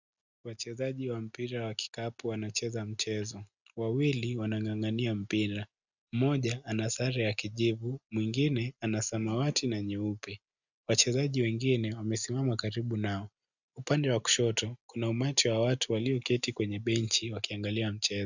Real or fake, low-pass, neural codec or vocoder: real; 7.2 kHz; none